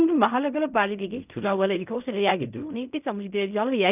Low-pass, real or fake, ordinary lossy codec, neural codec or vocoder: 3.6 kHz; fake; none; codec, 16 kHz in and 24 kHz out, 0.4 kbps, LongCat-Audio-Codec, fine tuned four codebook decoder